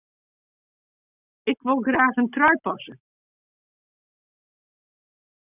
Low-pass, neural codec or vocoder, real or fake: 3.6 kHz; none; real